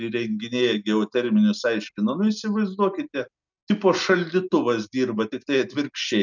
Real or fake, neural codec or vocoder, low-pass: real; none; 7.2 kHz